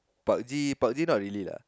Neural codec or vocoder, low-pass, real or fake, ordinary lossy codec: none; none; real; none